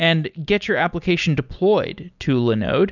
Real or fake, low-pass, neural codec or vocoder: real; 7.2 kHz; none